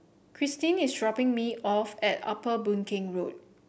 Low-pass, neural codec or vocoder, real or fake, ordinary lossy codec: none; none; real; none